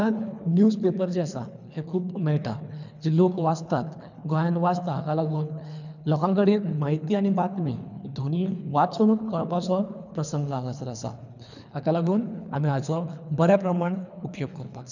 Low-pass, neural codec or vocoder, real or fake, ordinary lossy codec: 7.2 kHz; codec, 24 kHz, 3 kbps, HILCodec; fake; none